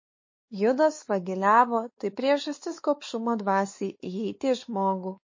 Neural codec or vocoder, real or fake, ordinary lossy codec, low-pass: codec, 16 kHz, 4 kbps, X-Codec, WavLM features, trained on Multilingual LibriSpeech; fake; MP3, 32 kbps; 7.2 kHz